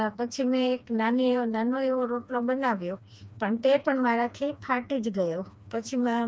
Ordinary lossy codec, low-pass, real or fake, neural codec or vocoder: none; none; fake; codec, 16 kHz, 2 kbps, FreqCodec, smaller model